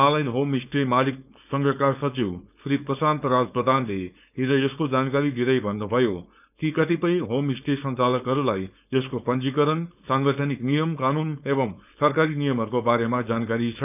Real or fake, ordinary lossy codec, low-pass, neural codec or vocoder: fake; none; 3.6 kHz; codec, 16 kHz, 4.8 kbps, FACodec